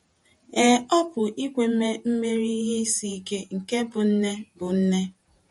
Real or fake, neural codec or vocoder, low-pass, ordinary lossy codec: fake; vocoder, 48 kHz, 128 mel bands, Vocos; 19.8 kHz; MP3, 48 kbps